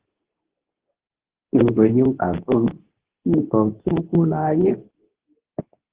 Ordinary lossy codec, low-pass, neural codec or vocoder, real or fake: Opus, 16 kbps; 3.6 kHz; codec, 24 kHz, 0.9 kbps, WavTokenizer, medium speech release version 2; fake